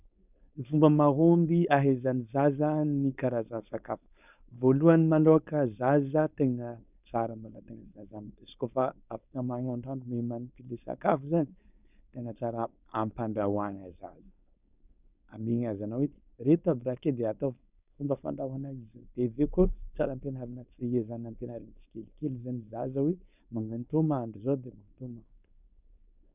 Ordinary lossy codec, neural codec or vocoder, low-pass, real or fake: none; codec, 16 kHz, 4.8 kbps, FACodec; 3.6 kHz; fake